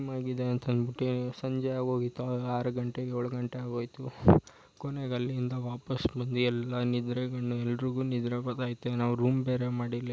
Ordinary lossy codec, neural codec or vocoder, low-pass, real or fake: none; none; none; real